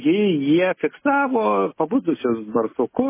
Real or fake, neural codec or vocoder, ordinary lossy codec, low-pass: real; none; MP3, 16 kbps; 3.6 kHz